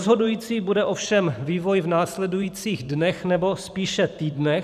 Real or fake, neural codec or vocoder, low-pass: fake; vocoder, 44.1 kHz, 128 mel bands every 512 samples, BigVGAN v2; 14.4 kHz